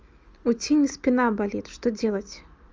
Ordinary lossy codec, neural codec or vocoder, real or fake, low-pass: Opus, 24 kbps; none; real; 7.2 kHz